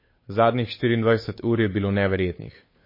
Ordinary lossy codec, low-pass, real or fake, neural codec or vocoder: MP3, 24 kbps; 5.4 kHz; fake; codec, 16 kHz, 8 kbps, FunCodec, trained on Chinese and English, 25 frames a second